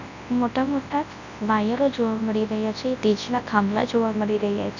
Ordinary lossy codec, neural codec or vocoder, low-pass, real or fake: none; codec, 24 kHz, 0.9 kbps, WavTokenizer, large speech release; 7.2 kHz; fake